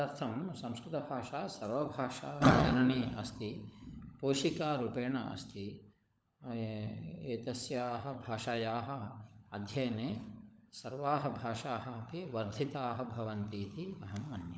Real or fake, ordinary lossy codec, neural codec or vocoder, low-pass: fake; none; codec, 16 kHz, 16 kbps, FunCodec, trained on LibriTTS, 50 frames a second; none